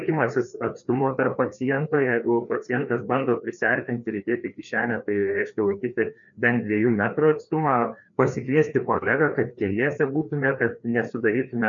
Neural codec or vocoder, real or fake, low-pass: codec, 16 kHz, 2 kbps, FreqCodec, larger model; fake; 7.2 kHz